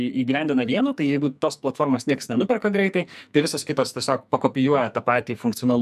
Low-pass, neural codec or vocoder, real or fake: 14.4 kHz; codec, 44.1 kHz, 2.6 kbps, SNAC; fake